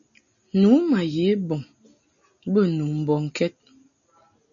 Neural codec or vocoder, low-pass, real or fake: none; 7.2 kHz; real